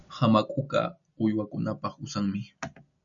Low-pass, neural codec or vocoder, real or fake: 7.2 kHz; none; real